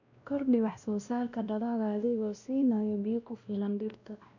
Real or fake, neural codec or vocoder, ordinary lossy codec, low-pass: fake; codec, 16 kHz, 1 kbps, X-Codec, WavLM features, trained on Multilingual LibriSpeech; none; 7.2 kHz